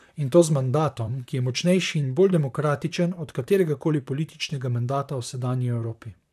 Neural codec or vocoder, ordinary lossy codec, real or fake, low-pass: vocoder, 44.1 kHz, 128 mel bands, Pupu-Vocoder; none; fake; 14.4 kHz